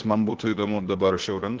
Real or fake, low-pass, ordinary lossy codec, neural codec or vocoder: fake; 7.2 kHz; Opus, 16 kbps; codec, 16 kHz, 0.8 kbps, ZipCodec